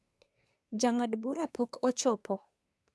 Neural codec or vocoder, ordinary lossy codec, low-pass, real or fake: codec, 24 kHz, 1 kbps, SNAC; none; none; fake